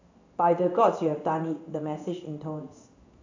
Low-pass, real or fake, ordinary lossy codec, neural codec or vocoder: 7.2 kHz; fake; AAC, 48 kbps; codec, 16 kHz in and 24 kHz out, 1 kbps, XY-Tokenizer